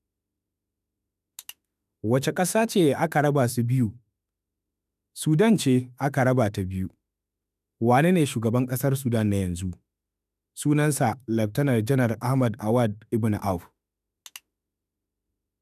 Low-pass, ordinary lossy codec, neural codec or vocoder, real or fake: 14.4 kHz; none; autoencoder, 48 kHz, 32 numbers a frame, DAC-VAE, trained on Japanese speech; fake